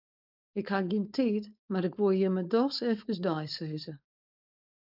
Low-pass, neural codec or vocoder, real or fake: 5.4 kHz; codec, 16 kHz, 4.8 kbps, FACodec; fake